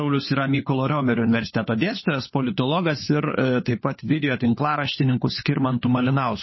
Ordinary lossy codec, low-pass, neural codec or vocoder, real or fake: MP3, 24 kbps; 7.2 kHz; codec, 16 kHz, 4 kbps, FunCodec, trained on LibriTTS, 50 frames a second; fake